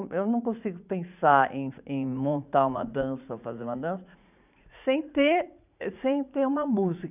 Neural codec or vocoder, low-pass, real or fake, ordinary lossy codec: vocoder, 44.1 kHz, 80 mel bands, Vocos; 3.6 kHz; fake; none